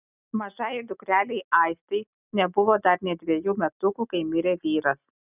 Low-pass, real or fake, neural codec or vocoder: 3.6 kHz; real; none